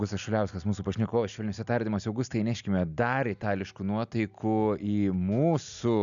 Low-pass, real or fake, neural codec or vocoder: 7.2 kHz; real; none